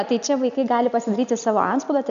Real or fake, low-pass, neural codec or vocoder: real; 7.2 kHz; none